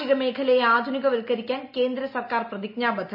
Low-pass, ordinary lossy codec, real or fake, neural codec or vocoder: 5.4 kHz; none; real; none